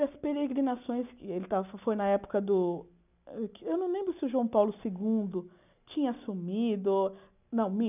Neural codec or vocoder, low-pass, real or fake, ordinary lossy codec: none; 3.6 kHz; real; none